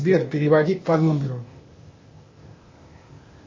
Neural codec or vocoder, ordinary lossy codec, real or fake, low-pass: codec, 16 kHz, 0.8 kbps, ZipCodec; MP3, 32 kbps; fake; 7.2 kHz